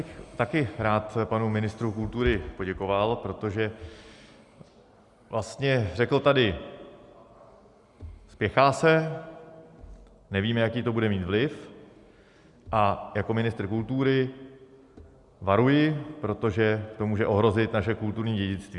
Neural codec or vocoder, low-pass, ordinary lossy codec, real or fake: none; 10.8 kHz; Opus, 64 kbps; real